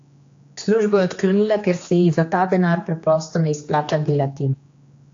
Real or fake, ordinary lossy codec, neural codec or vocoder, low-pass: fake; AAC, 48 kbps; codec, 16 kHz, 2 kbps, X-Codec, HuBERT features, trained on general audio; 7.2 kHz